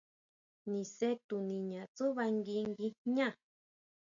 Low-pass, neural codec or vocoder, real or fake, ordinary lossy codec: 7.2 kHz; none; real; MP3, 64 kbps